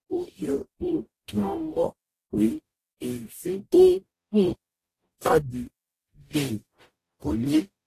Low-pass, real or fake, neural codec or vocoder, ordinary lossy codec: 14.4 kHz; fake; codec, 44.1 kHz, 0.9 kbps, DAC; AAC, 48 kbps